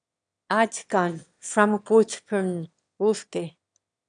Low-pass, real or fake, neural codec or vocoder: 9.9 kHz; fake; autoencoder, 22.05 kHz, a latent of 192 numbers a frame, VITS, trained on one speaker